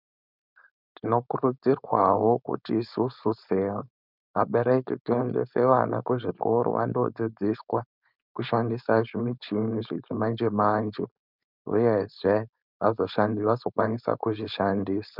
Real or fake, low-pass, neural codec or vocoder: fake; 5.4 kHz; codec, 16 kHz, 4.8 kbps, FACodec